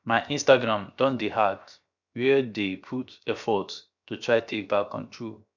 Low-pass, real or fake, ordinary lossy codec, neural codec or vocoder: 7.2 kHz; fake; none; codec, 16 kHz, 0.7 kbps, FocalCodec